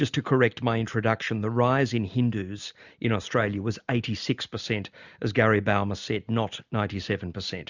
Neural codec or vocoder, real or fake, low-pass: none; real; 7.2 kHz